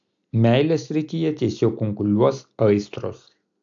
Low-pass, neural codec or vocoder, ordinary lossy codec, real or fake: 7.2 kHz; none; AAC, 64 kbps; real